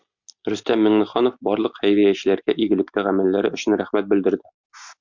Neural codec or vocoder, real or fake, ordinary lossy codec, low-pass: none; real; MP3, 64 kbps; 7.2 kHz